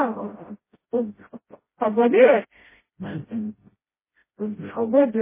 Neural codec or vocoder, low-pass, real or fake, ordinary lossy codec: codec, 16 kHz, 0.5 kbps, FreqCodec, smaller model; 3.6 kHz; fake; MP3, 16 kbps